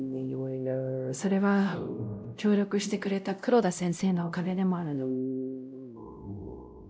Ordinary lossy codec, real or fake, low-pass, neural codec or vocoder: none; fake; none; codec, 16 kHz, 0.5 kbps, X-Codec, WavLM features, trained on Multilingual LibriSpeech